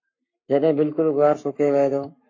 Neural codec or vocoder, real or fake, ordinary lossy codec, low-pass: codec, 44.1 kHz, 7.8 kbps, Pupu-Codec; fake; MP3, 32 kbps; 7.2 kHz